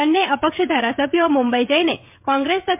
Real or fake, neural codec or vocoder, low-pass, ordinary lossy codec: fake; codec, 16 kHz, 16 kbps, FreqCodec, smaller model; 3.6 kHz; MP3, 32 kbps